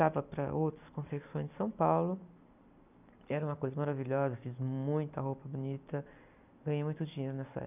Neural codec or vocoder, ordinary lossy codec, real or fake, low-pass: none; none; real; 3.6 kHz